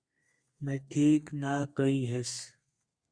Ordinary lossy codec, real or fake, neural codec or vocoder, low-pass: Opus, 64 kbps; fake; codec, 32 kHz, 1.9 kbps, SNAC; 9.9 kHz